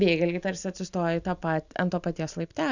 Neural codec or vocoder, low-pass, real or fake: none; 7.2 kHz; real